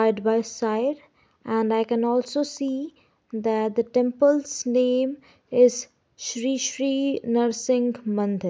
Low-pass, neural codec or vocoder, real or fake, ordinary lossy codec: none; none; real; none